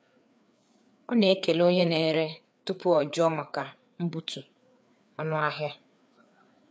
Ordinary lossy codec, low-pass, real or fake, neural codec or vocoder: none; none; fake; codec, 16 kHz, 4 kbps, FreqCodec, larger model